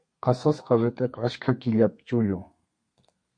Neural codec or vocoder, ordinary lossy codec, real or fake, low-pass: codec, 32 kHz, 1.9 kbps, SNAC; MP3, 48 kbps; fake; 9.9 kHz